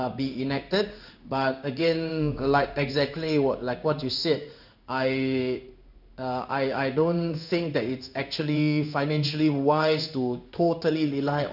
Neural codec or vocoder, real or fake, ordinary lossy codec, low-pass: codec, 16 kHz in and 24 kHz out, 1 kbps, XY-Tokenizer; fake; none; 5.4 kHz